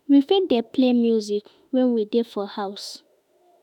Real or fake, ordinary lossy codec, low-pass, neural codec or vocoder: fake; none; 19.8 kHz; autoencoder, 48 kHz, 32 numbers a frame, DAC-VAE, trained on Japanese speech